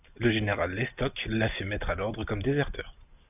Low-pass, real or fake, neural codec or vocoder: 3.6 kHz; real; none